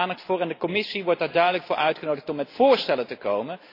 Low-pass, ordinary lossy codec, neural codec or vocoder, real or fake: 5.4 kHz; AAC, 32 kbps; none; real